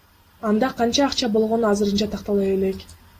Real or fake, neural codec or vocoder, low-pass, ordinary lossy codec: real; none; 14.4 kHz; AAC, 48 kbps